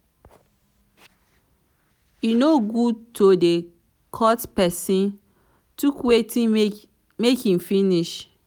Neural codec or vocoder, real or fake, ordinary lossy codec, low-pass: none; real; none; 19.8 kHz